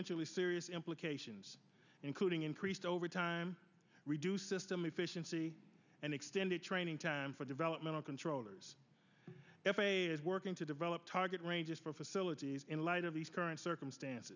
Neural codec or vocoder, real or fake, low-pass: none; real; 7.2 kHz